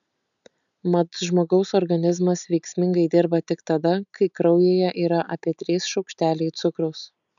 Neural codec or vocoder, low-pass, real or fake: none; 7.2 kHz; real